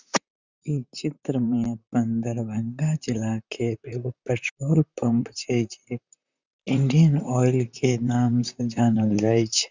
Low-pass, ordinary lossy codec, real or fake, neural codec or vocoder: 7.2 kHz; Opus, 64 kbps; fake; vocoder, 44.1 kHz, 128 mel bands, Pupu-Vocoder